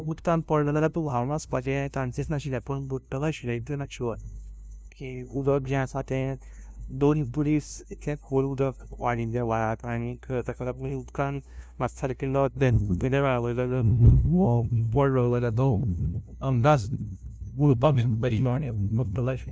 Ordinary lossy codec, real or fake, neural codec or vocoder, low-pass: none; fake; codec, 16 kHz, 0.5 kbps, FunCodec, trained on LibriTTS, 25 frames a second; none